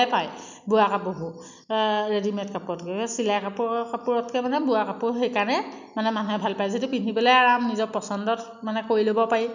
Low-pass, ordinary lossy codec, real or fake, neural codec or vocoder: 7.2 kHz; none; real; none